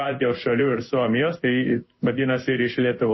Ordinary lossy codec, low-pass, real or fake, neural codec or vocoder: MP3, 24 kbps; 7.2 kHz; fake; codec, 16 kHz in and 24 kHz out, 1 kbps, XY-Tokenizer